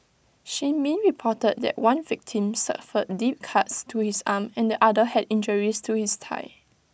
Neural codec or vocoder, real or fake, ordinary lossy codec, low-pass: none; real; none; none